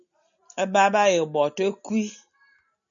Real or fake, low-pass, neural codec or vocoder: real; 7.2 kHz; none